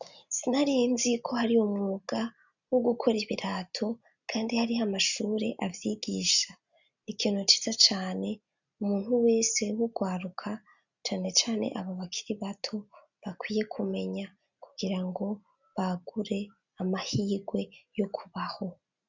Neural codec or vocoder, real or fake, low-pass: none; real; 7.2 kHz